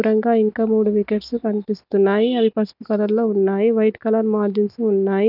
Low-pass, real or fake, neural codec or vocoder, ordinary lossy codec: 5.4 kHz; real; none; none